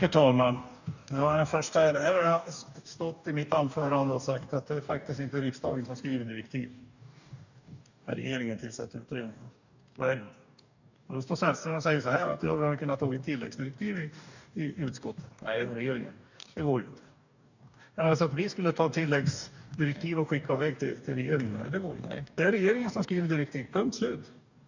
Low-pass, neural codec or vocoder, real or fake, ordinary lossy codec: 7.2 kHz; codec, 44.1 kHz, 2.6 kbps, DAC; fake; none